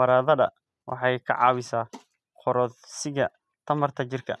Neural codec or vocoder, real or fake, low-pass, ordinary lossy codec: none; real; none; none